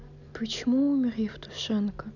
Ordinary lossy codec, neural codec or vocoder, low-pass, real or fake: none; none; 7.2 kHz; real